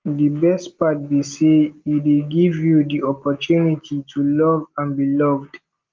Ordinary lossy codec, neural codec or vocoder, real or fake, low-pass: Opus, 32 kbps; none; real; 7.2 kHz